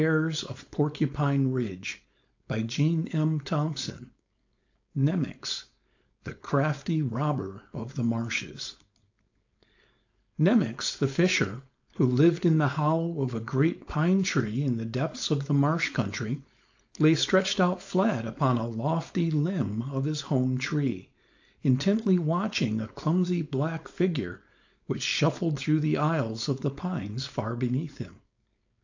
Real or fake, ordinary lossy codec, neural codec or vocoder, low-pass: fake; AAC, 48 kbps; codec, 16 kHz, 4.8 kbps, FACodec; 7.2 kHz